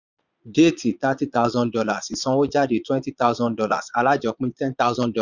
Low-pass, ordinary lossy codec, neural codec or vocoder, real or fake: 7.2 kHz; none; vocoder, 22.05 kHz, 80 mel bands, Vocos; fake